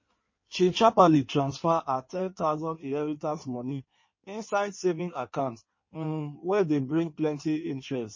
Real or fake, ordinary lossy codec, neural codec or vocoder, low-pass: fake; MP3, 32 kbps; codec, 16 kHz in and 24 kHz out, 1.1 kbps, FireRedTTS-2 codec; 7.2 kHz